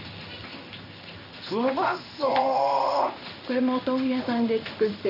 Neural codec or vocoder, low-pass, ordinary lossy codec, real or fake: codec, 16 kHz in and 24 kHz out, 1 kbps, XY-Tokenizer; 5.4 kHz; none; fake